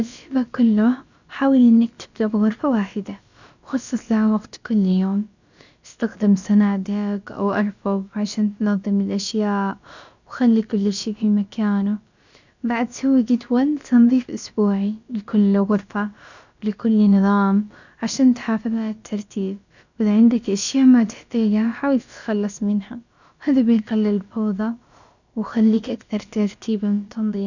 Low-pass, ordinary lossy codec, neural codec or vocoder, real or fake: 7.2 kHz; none; codec, 16 kHz, about 1 kbps, DyCAST, with the encoder's durations; fake